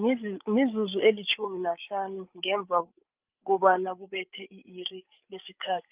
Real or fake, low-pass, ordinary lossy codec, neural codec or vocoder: fake; 3.6 kHz; Opus, 24 kbps; codec, 16 kHz, 8 kbps, FreqCodec, larger model